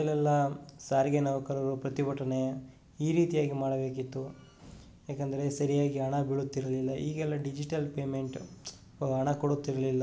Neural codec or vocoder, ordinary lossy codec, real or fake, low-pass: none; none; real; none